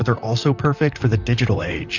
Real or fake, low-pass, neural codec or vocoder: fake; 7.2 kHz; vocoder, 44.1 kHz, 128 mel bands, Pupu-Vocoder